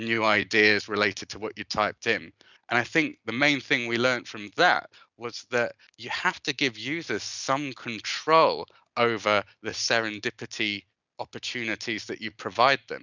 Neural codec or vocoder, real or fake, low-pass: none; real; 7.2 kHz